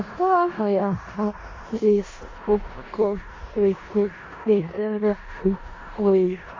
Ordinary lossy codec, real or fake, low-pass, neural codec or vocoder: AAC, 32 kbps; fake; 7.2 kHz; codec, 16 kHz in and 24 kHz out, 0.4 kbps, LongCat-Audio-Codec, four codebook decoder